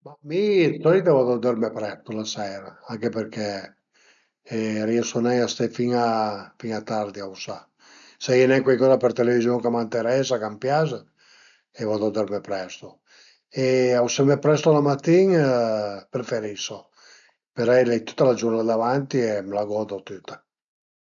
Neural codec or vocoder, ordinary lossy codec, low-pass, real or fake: none; none; 7.2 kHz; real